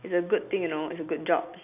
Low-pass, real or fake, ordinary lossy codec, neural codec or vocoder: 3.6 kHz; real; none; none